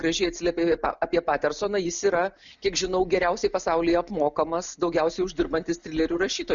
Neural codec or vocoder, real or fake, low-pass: none; real; 7.2 kHz